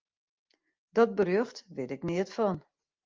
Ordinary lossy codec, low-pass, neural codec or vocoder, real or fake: Opus, 24 kbps; 7.2 kHz; none; real